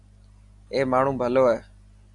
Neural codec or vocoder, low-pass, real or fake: none; 10.8 kHz; real